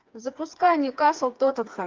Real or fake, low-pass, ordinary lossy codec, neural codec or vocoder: fake; 7.2 kHz; Opus, 24 kbps; codec, 16 kHz, 4 kbps, FreqCodec, smaller model